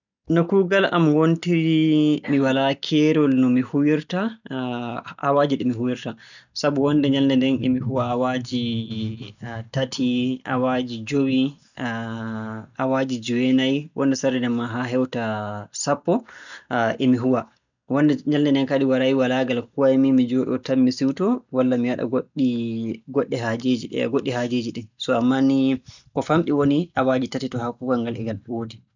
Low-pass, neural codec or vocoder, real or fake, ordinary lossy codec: 7.2 kHz; none; real; none